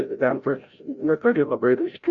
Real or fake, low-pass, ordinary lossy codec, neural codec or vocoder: fake; 7.2 kHz; Opus, 64 kbps; codec, 16 kHz, 0.5 kbps, FreqCodec, larger model